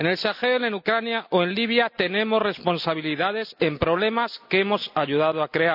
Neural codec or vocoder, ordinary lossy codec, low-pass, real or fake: none; none; 5.4 kHz; real